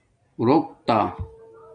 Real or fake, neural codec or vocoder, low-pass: real; none; 9.9 kHz